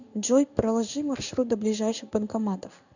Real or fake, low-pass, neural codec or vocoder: fake; 7.2 kHz; codec, 16 kHz in and 24 kHz out, 1 kbps, XY-Tokenizer